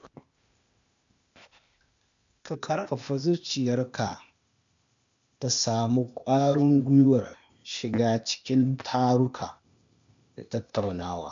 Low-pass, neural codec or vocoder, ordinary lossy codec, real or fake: 7.2 kHz; codec, 16 kHz, 0.8 kbps, ZipCodec; MP3, 64 kbps; fake